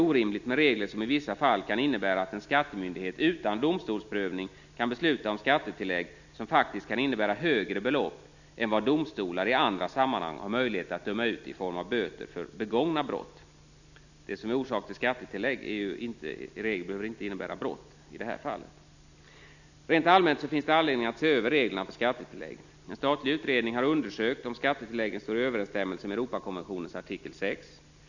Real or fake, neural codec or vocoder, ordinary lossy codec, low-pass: real; none; none; 7.2 kHz